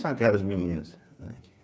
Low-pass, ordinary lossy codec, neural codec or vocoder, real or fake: none; none; codec, 16 kHz, 4 kbps, FreqCodec, smaller model; fake